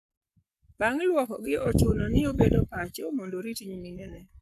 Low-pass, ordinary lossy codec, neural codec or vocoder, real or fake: 14.4 kHz; none; codec, 44.1 kHz, 7.8 kbps, Pupu-Codec; fake